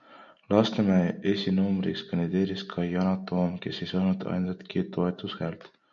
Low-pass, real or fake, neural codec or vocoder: 7.2 kHz; real; none